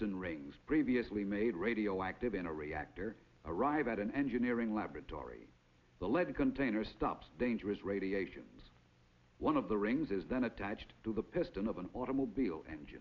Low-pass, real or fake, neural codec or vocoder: 7.2 kHz; real; none